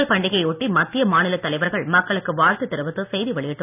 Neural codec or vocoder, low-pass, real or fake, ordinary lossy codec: none; 3.6 kHz; real; none